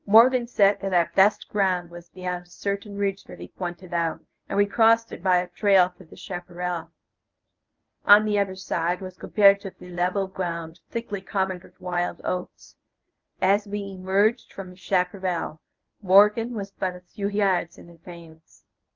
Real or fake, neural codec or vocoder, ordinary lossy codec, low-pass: fake; codec, 24 kHz, 0.9 kbps, WavTokenizer, medium speech release version 1; Opus, 24 kbps; 7.2 kHz